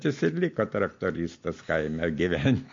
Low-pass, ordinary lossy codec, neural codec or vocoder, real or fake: 7.2 kHz; MP3, 48 kbps; none; real